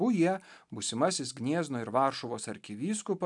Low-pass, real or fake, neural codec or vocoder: 10.8 kHz; real; none